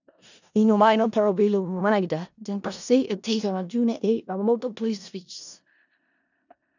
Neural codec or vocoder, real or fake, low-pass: codec, 16 kHz in and 24 kHz out, 0.4 kbps, LongCat-Audio-Codec, four codebook decoder; fake; 7.2 kHz